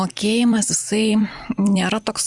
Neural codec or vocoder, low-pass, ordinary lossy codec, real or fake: vocoder, 44.1 kHz, 128 mel bands every 256 samples, BigVGAN v2; 10.8 kHz; Opus, 64 kbps; fake